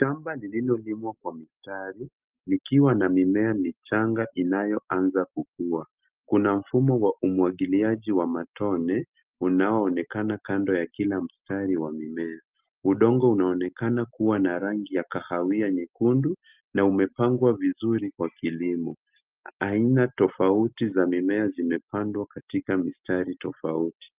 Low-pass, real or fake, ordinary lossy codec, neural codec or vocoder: 3.6 kHz; real; Opus, 32 kbps; none